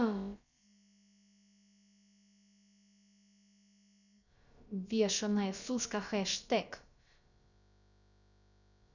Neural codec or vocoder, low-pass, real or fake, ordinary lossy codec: codec, 16 kHz, about 1 kbps, DyCAST, with the encoder's durations; 7.2 kHz; fake; none